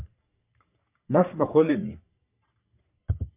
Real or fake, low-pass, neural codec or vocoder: fake; 3.6 kHz; codec, 44.1 kHz, 3.4 kbps, Pupu-Codec